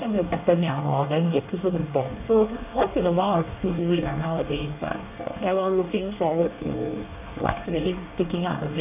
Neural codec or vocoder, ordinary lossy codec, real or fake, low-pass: codec, 24 kHz, 1 kbps, SNAC; none; fake; 3.6 kHz